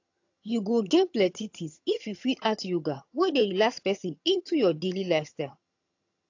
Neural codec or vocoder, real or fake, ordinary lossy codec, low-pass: vocoder, 22.05 kHz, 80 mel bands, HiFi-GAN; fake; AAC, 48 kbps; 7.2 kHz